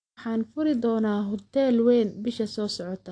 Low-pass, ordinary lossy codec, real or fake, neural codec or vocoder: 9.9 kHz; AAC, 48 kbps; real; none